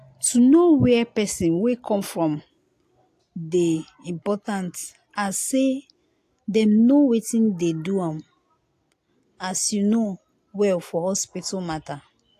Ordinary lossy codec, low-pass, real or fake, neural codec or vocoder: AAC, 64 kbps; 14.4 kHz; real; none